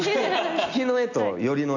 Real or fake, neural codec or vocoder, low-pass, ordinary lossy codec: real; none; 7.2 kHz; AAC, 48 kbps